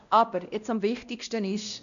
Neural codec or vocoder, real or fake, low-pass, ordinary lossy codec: codec, 16 kHz, 1 kbps, X-Codec, WavLM features, trained on Multilingual LibriSpeech; fake; 7.2 kHz; none